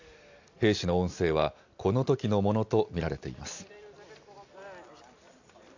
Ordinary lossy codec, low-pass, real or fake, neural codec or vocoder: AAC, 48 kbps; 7.2 kHz; real; none